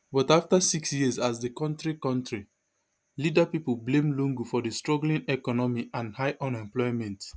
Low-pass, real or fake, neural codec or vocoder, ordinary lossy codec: none; real; none; none